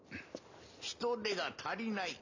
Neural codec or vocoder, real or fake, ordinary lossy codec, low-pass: none; real; AAC, 48 kbps; 7.2 kHz